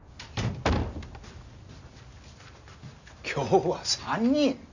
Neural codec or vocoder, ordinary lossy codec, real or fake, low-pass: none; none; real; 7.2 kHz